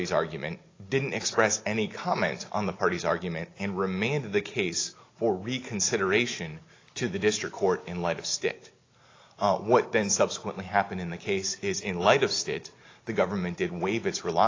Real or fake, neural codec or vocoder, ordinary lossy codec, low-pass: real; none; AAC, 32 kbps; 7.2 kHz